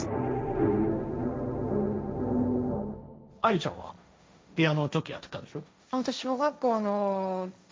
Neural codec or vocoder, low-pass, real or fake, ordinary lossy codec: codec, 16 kHz, 1.1 kbps, Voila-Tokenizer; none; fake; none